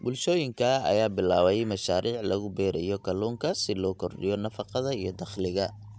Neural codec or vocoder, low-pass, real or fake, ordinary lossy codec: none; none; real; none